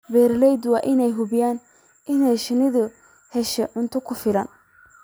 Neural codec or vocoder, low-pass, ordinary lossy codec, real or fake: vocoder, 44.1 kHz, 128 mel bands every 256 samples, BigVGAN v2; none; none; fake